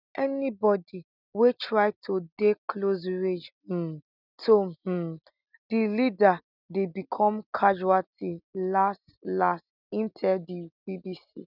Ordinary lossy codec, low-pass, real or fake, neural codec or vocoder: none; 5.4 kHz; real; none